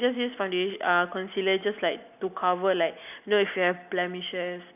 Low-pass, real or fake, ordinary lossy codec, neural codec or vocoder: 3.6 kHz; real; none; none